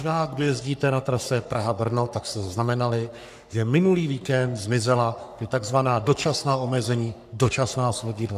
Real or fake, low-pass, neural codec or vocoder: fake; 14.4 kHz; codec, 44.1 kHz, 3.4 kbps, Pupu-Codec